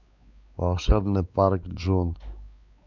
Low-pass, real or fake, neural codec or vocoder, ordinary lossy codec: 7.2 kHz; fake; codec, 16 kHz, 4 kbps, X-Codec, WavLM features, trained on Multilingual LibriSpeech; none